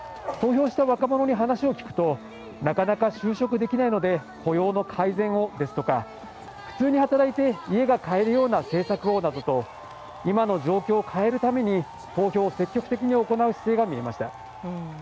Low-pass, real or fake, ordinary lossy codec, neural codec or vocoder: none; real; none; none